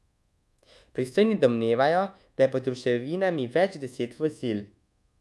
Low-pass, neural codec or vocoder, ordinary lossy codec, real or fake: none; codec, 24 kHz, 1.2 kbps, DualCodec; none; fake